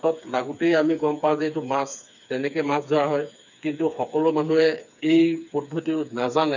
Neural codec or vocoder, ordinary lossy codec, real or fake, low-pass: codec, 16 kHz, 4 kbps, FreqCodec, smaller model; none; fake; 7.2 kHz